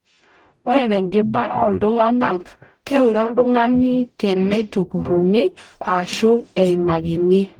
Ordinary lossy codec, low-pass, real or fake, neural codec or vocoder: Opus, 24 kbps; 19.8 kHz; fake; codec, 44.1 kHz, 0.9 kbps, DAC